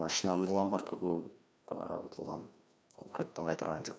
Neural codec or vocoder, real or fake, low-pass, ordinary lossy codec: codec, 16 kHz, 1 kbps, FreqCodec, larger model; fake; none; none